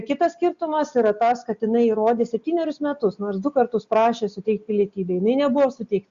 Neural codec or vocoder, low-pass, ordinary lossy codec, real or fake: none; 7.2 kHz; Opus, 64 kbps; real